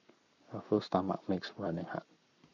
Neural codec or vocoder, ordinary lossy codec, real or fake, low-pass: codec, 44.1 kHz, 7.8 kbps, Pupu-Codec; none; fake; 7.2 kHz